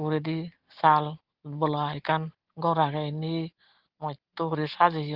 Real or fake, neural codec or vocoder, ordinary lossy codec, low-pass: real; none; Opus, 16 kbps; 5.4 kHz